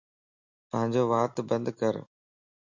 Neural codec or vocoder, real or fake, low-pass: none; real; 7.2 kHz